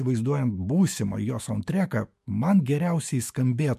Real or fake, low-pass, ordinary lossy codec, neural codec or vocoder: fake; 14.4 kHz; MP3, 64 kbps; autoencoder, 48 kHz, 128 numbers a frame, DAC-VAE, trained on Japanese speech